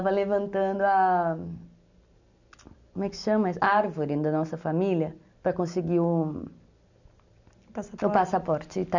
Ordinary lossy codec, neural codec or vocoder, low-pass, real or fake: none; none; 7.2 kHz; real